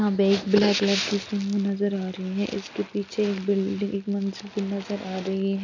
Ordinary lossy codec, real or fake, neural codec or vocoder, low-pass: none; real; none; 7.2 kHz